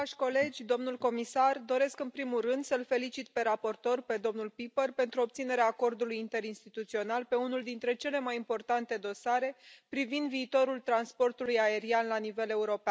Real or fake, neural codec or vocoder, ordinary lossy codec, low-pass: real; none; none; none